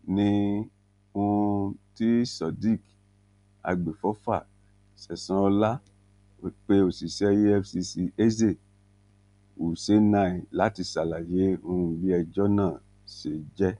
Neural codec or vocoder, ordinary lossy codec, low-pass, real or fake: none; none; 10.8 kHz; real